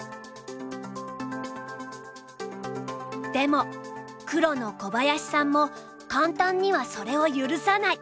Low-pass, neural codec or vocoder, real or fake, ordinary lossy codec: none; none; real; none